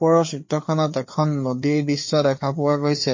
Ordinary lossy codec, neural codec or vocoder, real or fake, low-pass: MP3, 32 kbps; codec, 16 kHz, 4 kbps, FreqCodec, larger model; fake; 7.2 kHz